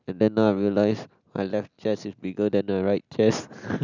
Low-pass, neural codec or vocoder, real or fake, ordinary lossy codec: 7.2 kHz; none; real; none